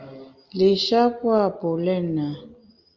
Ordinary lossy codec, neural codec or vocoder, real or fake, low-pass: Opus, 32 kbps; none; real; 7.2 kHz